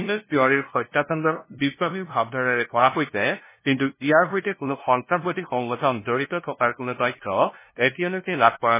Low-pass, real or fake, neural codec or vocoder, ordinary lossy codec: 3.6 kHz; fake; codec, 16 kHz, 0.5 kbps, FunCodec, trained on LibriTTS, 25 frames a second; MP3, 16 kbps